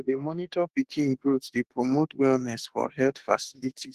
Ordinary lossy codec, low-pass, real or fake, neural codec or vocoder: Opus, 16 kbps; 14.4 kHz; fake; autoencoder, 48 kHz, 32 numbers a frame, DAC-VAE, trained on Japanese speech